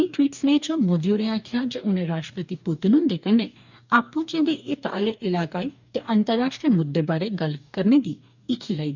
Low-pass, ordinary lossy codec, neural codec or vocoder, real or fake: 7.2 kHz; none; codec, 44.1 kHz, 2.6 kbps, DAC; fake